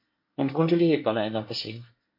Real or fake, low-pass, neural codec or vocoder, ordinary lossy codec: fake; 5.4 kHz; codec, 24 kHz, 1 kbps, SNAC; MP3, 32 kbps